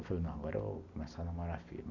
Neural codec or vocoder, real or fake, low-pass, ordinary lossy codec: none; real; 7.2 kHz; none